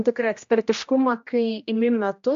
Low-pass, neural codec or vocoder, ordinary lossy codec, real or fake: 7.2 kHz; codec, 16 kHz, 1 kbps, X-Codec, HuBERT features, trained on general audio; MP3, 48 kbps; fake